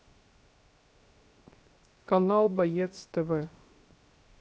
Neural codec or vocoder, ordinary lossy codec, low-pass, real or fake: codec, 16 kHz, 0.7 kbps, FocalCodec; none; none; fake